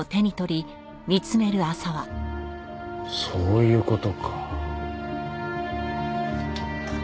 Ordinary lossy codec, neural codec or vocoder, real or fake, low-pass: none; none; real; none